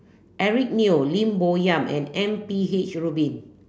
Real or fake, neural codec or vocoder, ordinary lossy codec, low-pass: real; none; none; none